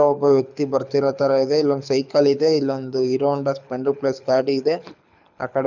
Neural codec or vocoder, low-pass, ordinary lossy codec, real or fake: codec, 24 kHz, 6 kbps, HILCodec; 7.2 kHz; none; fake